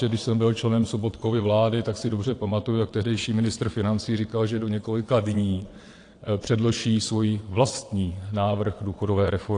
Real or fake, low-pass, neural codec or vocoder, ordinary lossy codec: fake; 9.9 kHz; vocoder, 22.05 kHz, 80 mel bands, WaveNeXt; AAC, 48 kbps